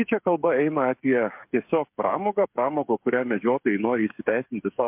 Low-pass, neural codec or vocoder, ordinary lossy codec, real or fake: 3.6 kHz; codec, 16 kHz, 8 kbps, FreqCodec, smaller model; MP3, 32 kbps; fake